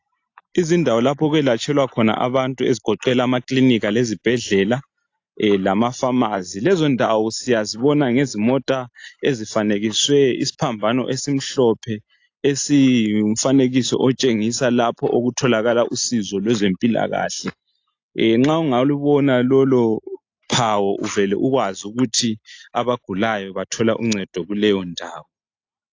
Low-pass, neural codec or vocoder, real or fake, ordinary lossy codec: 7.2 kHz; none; real; AAC, 48 kbps